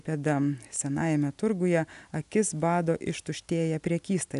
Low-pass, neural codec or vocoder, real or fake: 10.8 kHz; none; real